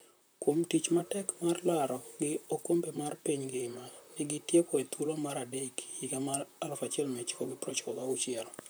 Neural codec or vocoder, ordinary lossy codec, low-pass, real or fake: vocoder, 44.1 kHz, 128 mel bands, Pupu-Vocoder; none; none; fake